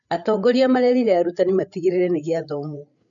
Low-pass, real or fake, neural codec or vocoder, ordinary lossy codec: 7.2 kHz; fake; codec, 16 kHz, 8 kbps, FreqCodec, larger model; none